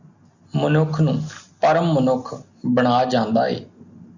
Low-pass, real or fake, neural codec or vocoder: 7.2 kHz; real; none